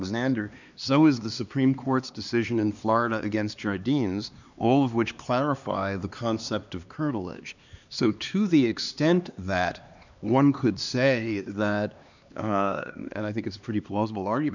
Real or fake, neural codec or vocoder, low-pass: fake; codec, 16 kHz, 2 kbps, X-Codec, HuBERT features, trained on LibriSpeech; 7.2 kHz